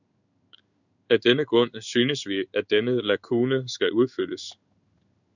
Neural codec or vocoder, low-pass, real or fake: codec, 16 kHz in and 24 kHz out, 1 kbps, XY-Tokenizer; 7.2 kHz; fake